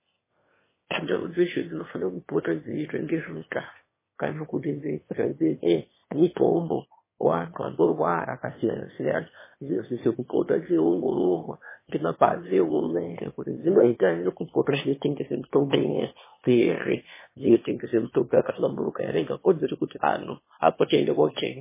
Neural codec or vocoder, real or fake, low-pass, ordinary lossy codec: autoencoder, 22.05 kHz, a latent of 192 numbers a frame, VITS, trained on one speaker; fake; 3.6 kHz; MP3, 16 kbps